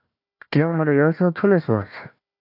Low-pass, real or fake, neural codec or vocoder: 5.4 kHz; fake; codec, 16 kHz, 1 kbps, FunCodec, trained on Chinese and English, 50 frames a second